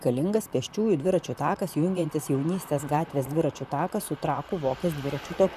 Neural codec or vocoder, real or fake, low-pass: vocoder, 44.1 kHz, 128 mel bands, Pupu-Vocoder; fake; 14.4 kHz